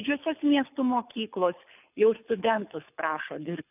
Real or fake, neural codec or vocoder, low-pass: fake; codec, 24 kHz, 6 kbps, HILCodec; 3.6 kHz